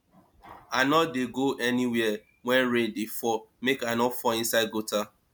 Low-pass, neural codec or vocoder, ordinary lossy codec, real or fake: 19.8 kHz; none; MP3, 96 kbps; real